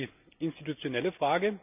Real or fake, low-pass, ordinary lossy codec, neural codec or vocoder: real; 3.6 kHz; none; none